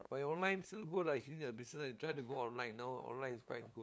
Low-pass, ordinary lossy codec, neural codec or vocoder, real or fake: none; none; codec, 16 kHz, 2 kbps, FunCodec, trained on LibriTTS, 25 frames a second; fake